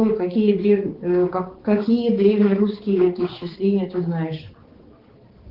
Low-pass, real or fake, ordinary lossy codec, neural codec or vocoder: 5.4 kHz; fake; Opus, 16 kbps; codec, 16 kHz, 4 kbps, X-Codec, HuBERT features, trained on balanced general audio